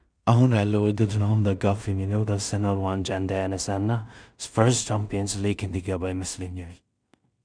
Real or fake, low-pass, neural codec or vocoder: fake; 9.9 kHz; codec, 16 kHz in and 24 kHz out, 0.4 kbps, LongCat-Audio-Codec, two codebook decoder